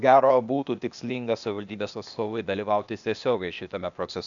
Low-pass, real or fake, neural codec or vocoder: 7.2 kHz; fake; codec, 16 kHz, 0.8 kbps, ZipCodec